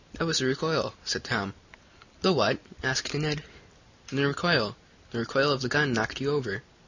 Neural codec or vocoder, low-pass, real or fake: none; 7.2 kHz; real